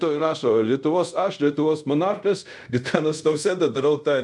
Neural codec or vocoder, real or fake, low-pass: codec, 24 kHz, 0.5 kbps, DualCodec; fake; 10.8 kHz